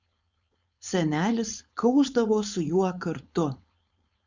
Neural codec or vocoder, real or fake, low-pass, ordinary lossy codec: codec, 16 kHz, 4.8 kbps, FACodec; fake; 7.2 kHz; Opus, 64 kbps